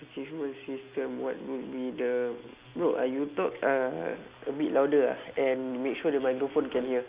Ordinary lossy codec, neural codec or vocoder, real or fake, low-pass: Opus, 64 kbps; none; real; 3.6 kHz